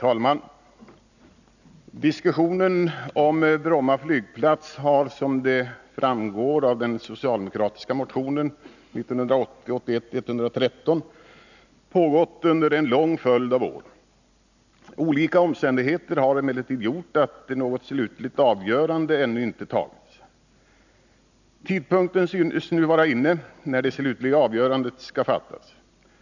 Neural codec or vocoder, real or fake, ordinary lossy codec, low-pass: none; real; none; 7.2 kHz